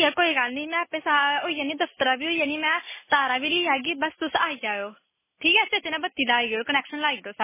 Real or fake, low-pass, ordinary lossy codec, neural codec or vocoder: real; 3.6 kHz; MP3, 16 kbps; none